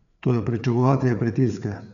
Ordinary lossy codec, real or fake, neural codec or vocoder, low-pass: none; fake; codec, 16 kHz, 4 kbps, FreqCodec, larger model; 7.2 kHz